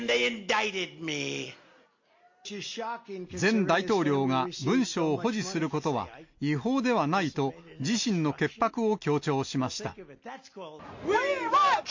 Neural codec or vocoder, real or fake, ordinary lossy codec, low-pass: none; real; MP3, 48 kbps; 7.2 kHz